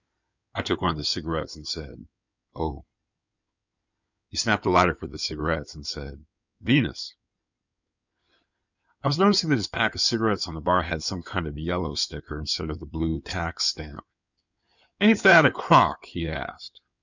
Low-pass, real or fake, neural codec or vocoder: 7.2 kHz; fake; codec, 16 kHz in and 24 kHz out, 2.2 kbps, FireRedTTS-2 codec